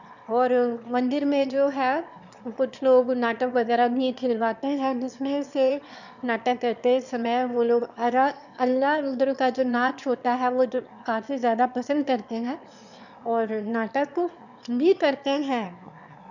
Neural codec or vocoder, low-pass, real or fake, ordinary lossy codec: autoencoder, 22.05 kHz, a latent of 192 numbers a frame, VITS, trained on one speaker; 7.2 kHz; fake; none